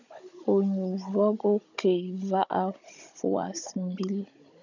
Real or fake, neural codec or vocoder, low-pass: fake; codec, 16 kHz, 16 kbps, FunCodec, trained on Chinese and English, 50 frames a second; 7.2 kHz